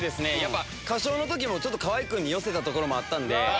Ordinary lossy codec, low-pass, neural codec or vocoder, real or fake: none; none; none; real